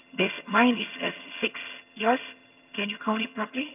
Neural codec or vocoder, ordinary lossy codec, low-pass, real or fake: vocoder, 22.05 kHz, 80 mel bands, HiFi-GAN; none; 3.6 kHz; fake